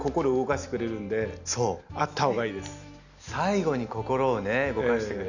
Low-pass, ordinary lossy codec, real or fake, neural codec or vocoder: 7.2 kHz; none; real; none